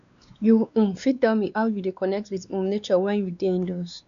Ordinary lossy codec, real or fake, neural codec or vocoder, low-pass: none; fake; codec, 16 kHz, 2 kbps, X-Codec, WavLM features, trained on Multilingual LibriSpeech; 7.2 kHz